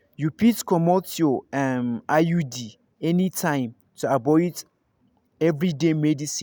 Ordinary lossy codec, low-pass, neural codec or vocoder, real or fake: none; none; none; real